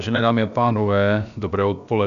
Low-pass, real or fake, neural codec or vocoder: 7.2 kHz; fake; codec, 16 kHz, about 1 kbps, DyCAST, with the encoder's durations